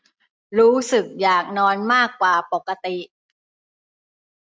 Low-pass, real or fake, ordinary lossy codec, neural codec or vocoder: none; real; none; none